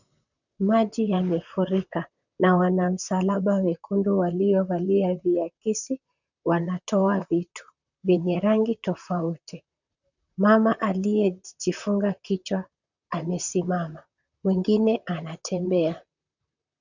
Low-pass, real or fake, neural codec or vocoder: 7.2 kHz; fake; vocoder, 44.1 kHz, 128 mel bands, Pupu-Vocoder